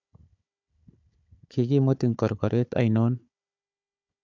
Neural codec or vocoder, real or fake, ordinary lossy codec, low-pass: codec, 16 kHz, 4 kbps, FunCodec, trained on Chinese and English, 50 frames a second; fake; MP3, 64 kbps; 7.2 kHz